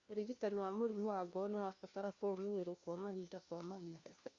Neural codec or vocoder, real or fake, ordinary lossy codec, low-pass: codec, 16 kHz, 1 kbps, FunCodec, trained on LibriTTS, 50 frames a second; fake; Opus, 64 kbps; 7.2 kHz